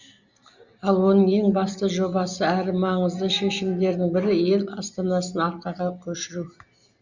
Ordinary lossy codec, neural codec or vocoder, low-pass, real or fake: Opus, 64 kbps; none; 7.2 kHz; real